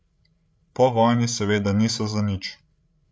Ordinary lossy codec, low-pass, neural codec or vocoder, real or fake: none; none; codec, 16 kHz, 16 kbps, FreqCodec, larger model; fake